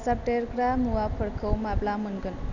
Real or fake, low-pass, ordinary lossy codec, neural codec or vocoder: real; 7.2 kHz; none; none